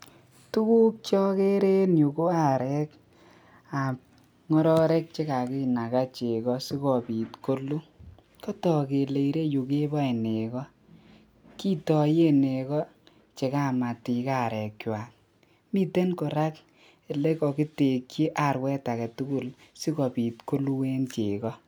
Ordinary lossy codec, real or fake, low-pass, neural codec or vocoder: none; real; none; none